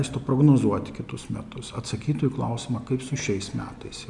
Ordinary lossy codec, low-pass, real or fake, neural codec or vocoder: MP3, 96 kbps; 10.8 kHz; real; none